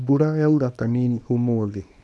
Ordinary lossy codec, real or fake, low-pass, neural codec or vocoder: none; fake; none; codec, 24 kHz, 0.9 kbps, WavTokenizer, small release